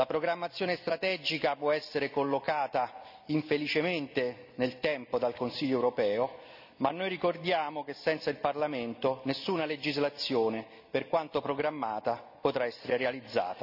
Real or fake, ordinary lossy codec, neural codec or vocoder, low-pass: real; none; none; 5.4 kHz